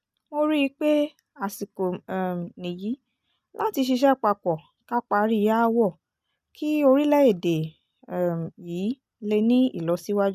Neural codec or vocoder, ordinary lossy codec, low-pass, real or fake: none; none; 14.4 kHz; real